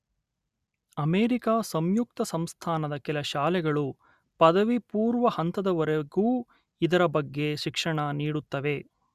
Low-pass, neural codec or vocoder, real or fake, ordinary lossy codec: 14.4 kHz; none; real; Opus, 64 kbps